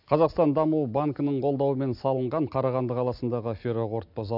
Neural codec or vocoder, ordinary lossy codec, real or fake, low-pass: none; none; real; 5.4 kHz